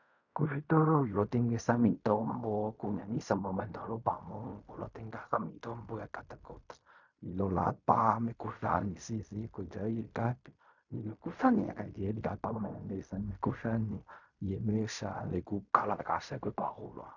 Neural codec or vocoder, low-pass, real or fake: codec, 16 kHz in and 24 kHz out, 0.4 kbps, LongCat-Audio-Codec, fine tuned four codebook decoder; 7.2 kHz; fake